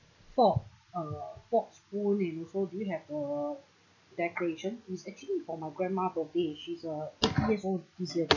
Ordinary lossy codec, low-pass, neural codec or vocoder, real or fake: none; 7.2 kHz; none; real